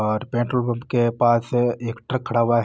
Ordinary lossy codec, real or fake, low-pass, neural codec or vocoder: none; real; none; none